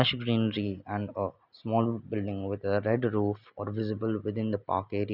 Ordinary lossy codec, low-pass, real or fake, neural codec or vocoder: none; 5.4 kHz; real; none